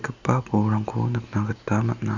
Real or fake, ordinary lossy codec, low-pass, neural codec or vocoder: real; none; 7.2 kHz; none